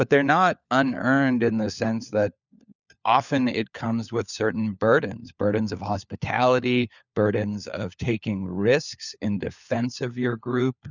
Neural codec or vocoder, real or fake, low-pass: codec, 16 kHz, 4 kbps, FunCodec, trained on LibriTTS, 50 frames a second; fake; 7.2 kHz